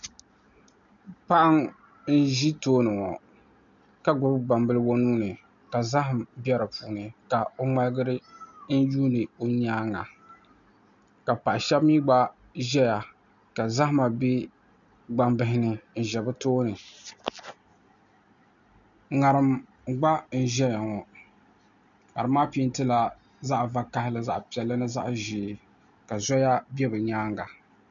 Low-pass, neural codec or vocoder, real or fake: 7.2 kHz; none; real